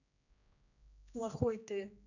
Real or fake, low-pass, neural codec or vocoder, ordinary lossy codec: fake; 7.2 kHz; codec, 16 kHz, 1 kbps, X-Codec, HuBERT features, trained on general audio; none